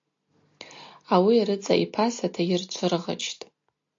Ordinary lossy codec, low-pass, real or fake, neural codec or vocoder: AAC, 48 kbps; 7.2 kHz; real; none